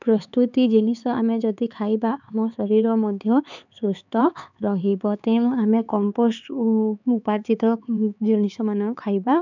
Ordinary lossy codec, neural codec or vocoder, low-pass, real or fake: none; codec, 16 kHz, 4 kbps, X-Codec, HuBERT features, trained on LibriSpeech; 7.2 kHz; fake